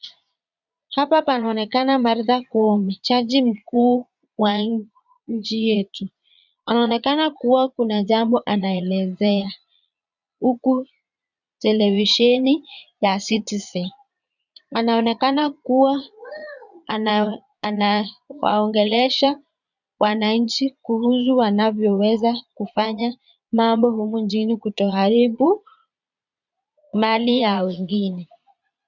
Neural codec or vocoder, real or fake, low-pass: vocoder, 22.05 kHz, 80 mel bands, Vocos; fake; 7.2 kHz